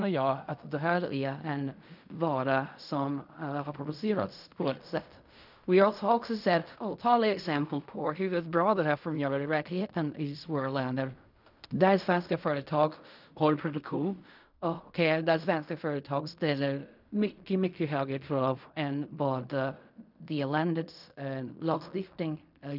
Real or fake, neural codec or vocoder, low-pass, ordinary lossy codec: fake; codec, 16 kHz in and 24 kHz out, 0.4 kbps, LongCat-Audio-Codec, fine tuned four codebook decoder; 5.4 kHz; none